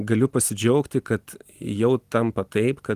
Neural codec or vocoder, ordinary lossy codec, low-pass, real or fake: none; Opus, 24 kbps; 14.4 kHz; real